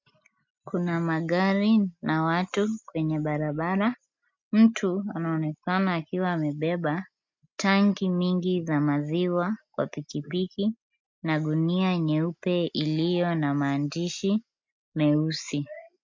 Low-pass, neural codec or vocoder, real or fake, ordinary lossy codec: 7.2 kHz; none; real; MP3, 64 kbps